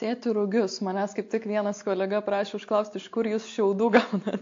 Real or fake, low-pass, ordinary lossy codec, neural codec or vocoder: real; 7.2 kHz; AAC, 48 kbps; none